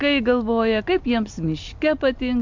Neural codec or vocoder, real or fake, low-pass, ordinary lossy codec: none; real; 7.2 kHz; MP3, 64 kbps